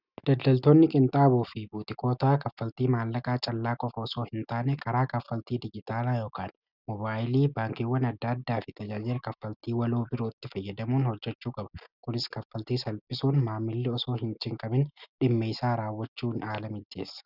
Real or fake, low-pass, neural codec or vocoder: real; 5.4 kHz; none